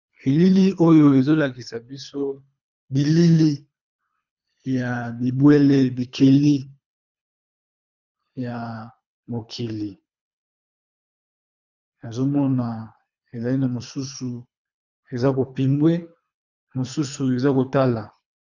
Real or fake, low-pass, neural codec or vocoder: fake; 7.2 kHz; codec, 24 kHz, 3 kbps, HILCodec